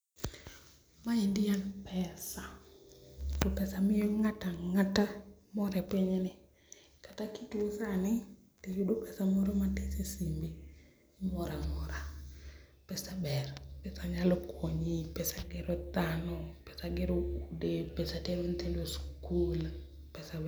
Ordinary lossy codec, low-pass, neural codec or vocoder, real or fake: none; none; none; real